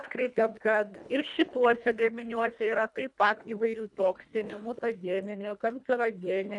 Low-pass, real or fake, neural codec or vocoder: 10.8 kHz; fake; codec, 24 kHz, 1.5 kbps, HILCodec